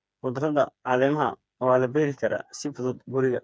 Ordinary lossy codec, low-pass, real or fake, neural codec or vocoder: none; none; fake; codec, 16 kHz, 4 kbps, FreqCodec, smaller model